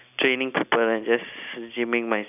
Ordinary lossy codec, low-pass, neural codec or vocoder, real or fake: none; 3.6 kHz; none; real